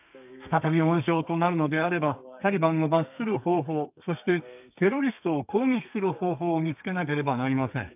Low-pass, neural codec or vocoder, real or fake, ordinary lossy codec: 3.6 kHz; codec, 32 kHz, 1.9 kbps, SNAC; fake; AAC, 32 kbps